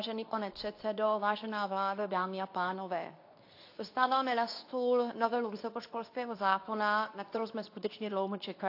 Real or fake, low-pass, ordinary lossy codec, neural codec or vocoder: fake; 5.4 kHz; AAC, 32 kbps; codec, 24 kHz, 0.9 kbps, WavTokenizer, medium speech release version 2